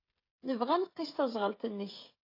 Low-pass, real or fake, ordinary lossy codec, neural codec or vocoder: 5.4 kHz; fake; AAC, 32 kbps; codec, 16 kHz, 16 kbps, FreqCodec, smaller model